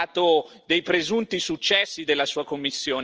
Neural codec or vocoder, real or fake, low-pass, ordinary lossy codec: none; real; 7.2 kHz; Opus, 24 kbps